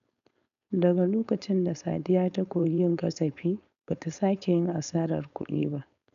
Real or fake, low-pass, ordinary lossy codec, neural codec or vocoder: fake; 7.2 kHz; none; codec, 16 kHz, 4.8 kbps, FACodec